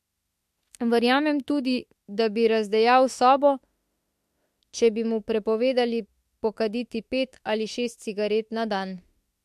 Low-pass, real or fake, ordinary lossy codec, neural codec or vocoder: 14.4 kHz; fake; MP3, 64 kbps; autoencoder, 48 kHz, 32 numbers a frame, DAC-VAE, trained on Japanese speech